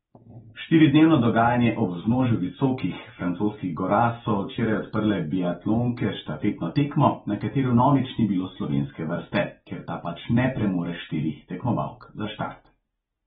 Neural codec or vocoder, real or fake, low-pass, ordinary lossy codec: none; real; 10.8 kHz; AAC, 16 kbps